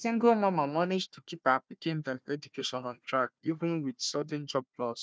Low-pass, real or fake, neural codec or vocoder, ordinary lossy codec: none; fake; codec, 16 kHz, 1 kbps, FunCodec, trained on Chinese and English, 50 frames a second; none